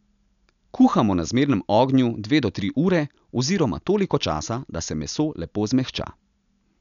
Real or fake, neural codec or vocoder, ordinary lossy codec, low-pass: real; none; none; 7.2 kHz